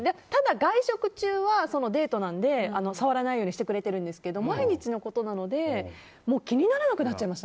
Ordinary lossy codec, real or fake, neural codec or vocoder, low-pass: none; real; none; none